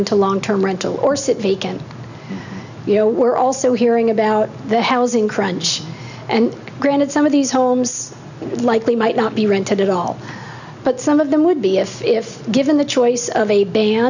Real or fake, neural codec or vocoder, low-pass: real; none; 7.2 kHz